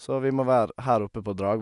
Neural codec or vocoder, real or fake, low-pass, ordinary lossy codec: none; real; 10.8 kHz; none